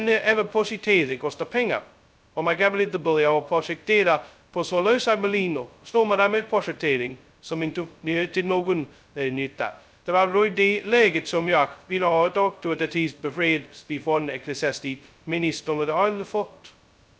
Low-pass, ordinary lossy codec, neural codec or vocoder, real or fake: none; none; codec, 16 kHz, 0.2 kbps, FocalCodec; fake